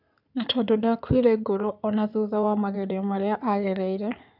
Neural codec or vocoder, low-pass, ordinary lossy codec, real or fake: codec, 16 kHz in and 24 kHz out, 2.2 kbps, FireRedTTS-2 codec; 5.4 kHz; none; fake